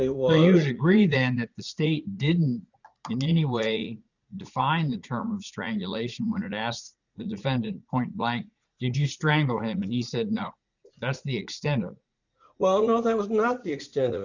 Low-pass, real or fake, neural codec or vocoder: 7.2 kHz; fake; vocoder, 44.1 kHz, 80 mel bands, Vocos